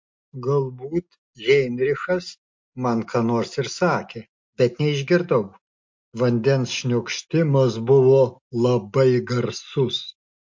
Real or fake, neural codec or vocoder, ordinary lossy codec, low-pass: real; none; MP3, 48 kbps; 7.2 kHz